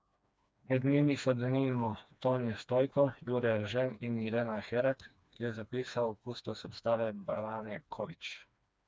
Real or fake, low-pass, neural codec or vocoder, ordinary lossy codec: fake; none; codec, 16 kHz, 2 kbps, FreqCodec, smaller model; none